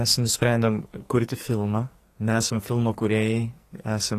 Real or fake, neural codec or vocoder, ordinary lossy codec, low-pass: fake; codec, 44.1 kHz, 2.6 kbps, SNAC; AAC, 48 kbps; 14.4 kHz